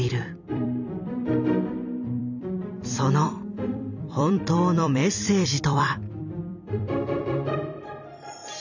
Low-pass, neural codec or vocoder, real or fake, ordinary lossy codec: 7.2 kHz; vocoder, 44.1 kHz, 128 mel bands every 256 samples, BigVGAN v2; fake; none